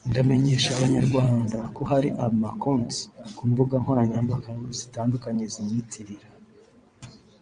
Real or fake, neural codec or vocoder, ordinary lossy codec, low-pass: fake; vocoder, 22.05 kHz, 80 mel bands, WaveNeXt; MP3, 64 kbps; 9.9 kHz